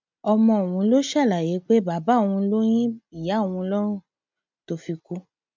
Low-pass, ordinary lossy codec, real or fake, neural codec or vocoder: 7.2 kHz; MP3, 64 kbps; real; none